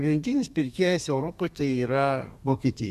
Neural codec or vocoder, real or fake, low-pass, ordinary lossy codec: codec, 32 kHz, 1.9 kbps, SNAC; fake; 14.4 kHz; MP3, 96 kbps